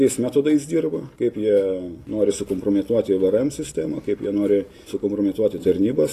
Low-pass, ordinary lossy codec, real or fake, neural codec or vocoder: 14.4 kHz; AAC, 48 kbps; real; none